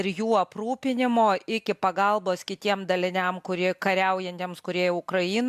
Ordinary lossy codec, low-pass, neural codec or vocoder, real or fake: MP3, 96 kbps; 14.4 kHz; none; real